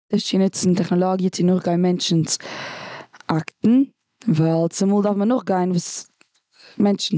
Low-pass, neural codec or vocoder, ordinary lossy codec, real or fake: none; none; none; real